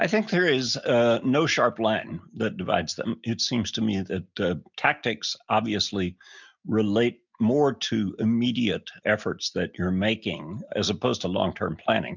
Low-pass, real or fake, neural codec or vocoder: 7.2 kHz; real; none